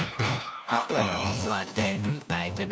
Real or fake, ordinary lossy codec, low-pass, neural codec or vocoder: fake; none; none; codec, 16 kHz, 1 kbps, FunCodec, trained on LibriTTS, 50 frames a second